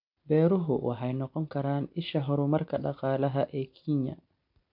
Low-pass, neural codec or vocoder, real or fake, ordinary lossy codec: 5.4 kHz; none; real; MP3, 48 kbps